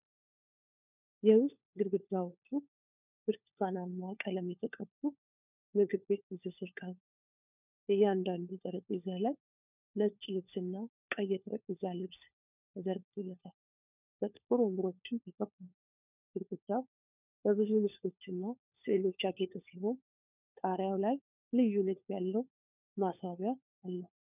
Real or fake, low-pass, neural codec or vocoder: fake; 3.6 kHz; codec, 16 kHz, 4 kbps, FunCodec, trained on LibriTTS, 50 frames a second